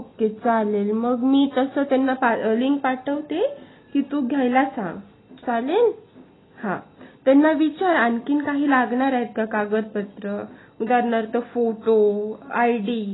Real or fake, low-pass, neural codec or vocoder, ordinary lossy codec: real; 7.2 kHz; none; AAC, 16 kbps